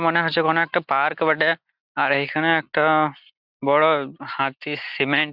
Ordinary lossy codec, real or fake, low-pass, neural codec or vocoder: Opus, 64 kbps; real; 5.4 kHz; none